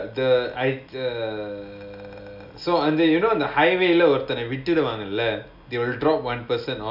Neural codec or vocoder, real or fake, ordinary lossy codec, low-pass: none; real; none; 5.4 kHz